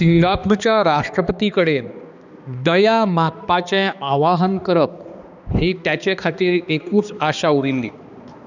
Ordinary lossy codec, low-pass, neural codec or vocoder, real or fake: none; 7.2 kHz; codec, 16 kHz, 2 kbps, X-Codec, HuBERT features, trained on balanced general audio; fake